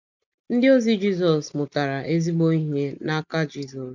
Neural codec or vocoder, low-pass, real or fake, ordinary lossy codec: none; 7.2 kHz; real; none